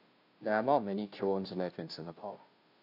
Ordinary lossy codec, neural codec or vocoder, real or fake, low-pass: AAC, 32 kbps; codec, 16 kHz, 0.5 kbps, FunCodec, trained on Chinese and English, 25 frames a second; fake; 5.4 kHz